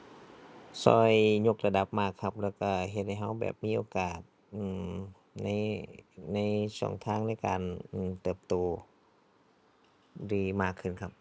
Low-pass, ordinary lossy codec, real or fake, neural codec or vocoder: none; none; real; none